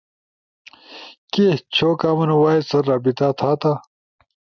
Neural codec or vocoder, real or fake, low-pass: none; real; 7.2 kHz